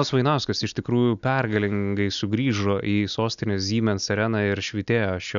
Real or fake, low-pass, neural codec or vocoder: real; 7.2 kHz; none